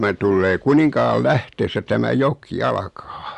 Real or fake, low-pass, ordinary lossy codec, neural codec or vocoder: real; 10.8 kHz; Opus, 64 kbps; none